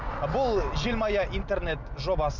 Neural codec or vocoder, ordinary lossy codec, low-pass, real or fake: none; none; 7.2 kHz; real